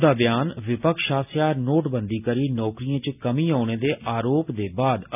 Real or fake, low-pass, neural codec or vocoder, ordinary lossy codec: real; 3.6 kHz; none; none